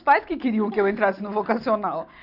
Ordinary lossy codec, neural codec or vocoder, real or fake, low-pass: none; none; real; 5.4 kHz